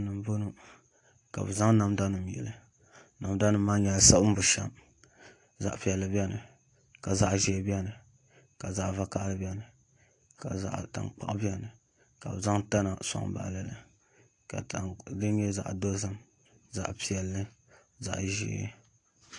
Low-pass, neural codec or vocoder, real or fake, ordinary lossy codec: 10.8 kHz; none; real; AAC, 48 kbps